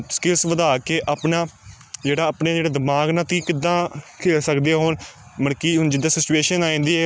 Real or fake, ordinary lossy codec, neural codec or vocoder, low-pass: real; none; none; none